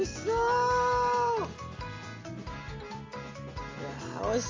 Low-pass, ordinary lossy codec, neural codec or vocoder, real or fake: 7.2 kHz; Opus, 32 kbps; none; real